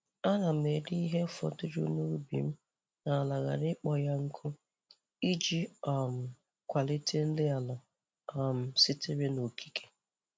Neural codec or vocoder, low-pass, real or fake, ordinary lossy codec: none; none; real; none